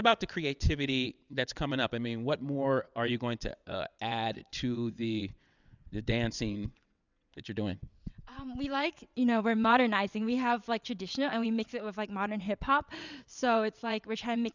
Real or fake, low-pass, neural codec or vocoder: fake; 7.2 kHz; vocoder, 22.05 kHz, 80 mel bands, WaveNeXt